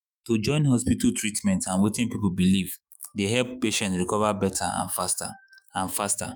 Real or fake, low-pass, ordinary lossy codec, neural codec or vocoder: fake; none; none; autoencoder, 48 kHz, 128 numbers a frame, DAC-VAE, trained on Japanese speech